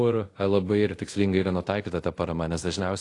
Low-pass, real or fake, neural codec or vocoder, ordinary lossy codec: 10.8 kHz; fake; codec, 24 kHz, 0.5 kbps, DualCodec; AAC, 48 kbps